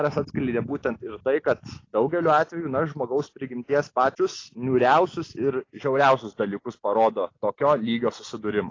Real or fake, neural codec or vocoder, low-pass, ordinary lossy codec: real; none; 7.2 kHz; AAC, 32 kbps